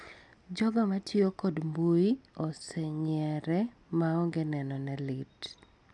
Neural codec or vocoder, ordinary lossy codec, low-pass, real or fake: none; none; 10.8 kHz; real